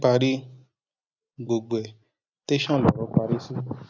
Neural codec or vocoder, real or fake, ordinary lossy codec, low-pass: none; real; none; 7.2 kHz